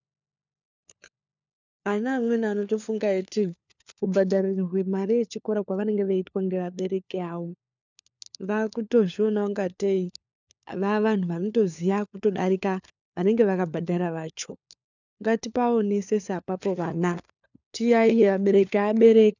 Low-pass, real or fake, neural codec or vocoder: 7.2 kHz; fake; codec, 16 kHz, 4 kbps, FunCodec, trained on LibriTTS, 50 frames a second